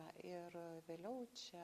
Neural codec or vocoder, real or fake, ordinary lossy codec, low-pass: none; real; Opus, 64 kbps; 14.4 kHz